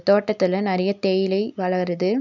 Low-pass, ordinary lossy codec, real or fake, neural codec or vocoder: 7.2 kHz; none; real; none